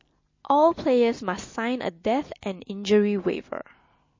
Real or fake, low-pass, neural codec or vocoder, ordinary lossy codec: real; 7.2 kHz; none; MP3, 32 kbps